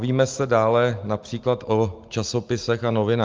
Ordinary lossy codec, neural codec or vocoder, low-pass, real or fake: Opus, 32 kbps; none; 7.2 kHz; real